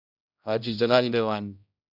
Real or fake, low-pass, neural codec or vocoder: fake; 5.4 kHz; codec, 16 kHz, 0.5 kbps, X-Codec, HuBERT features, trained on general audio